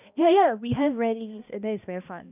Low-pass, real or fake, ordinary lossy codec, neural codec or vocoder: 3.6 kHz; fake; none; codec, 16 kHz, 1 kbps, X-Codec, HuBERT features, trained on balanced general audio